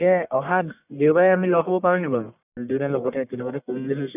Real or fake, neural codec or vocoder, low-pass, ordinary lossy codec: fake; codec, 44.1 kHz, 1.7 kbps, Pupu-Codec; 3.6 kHz; none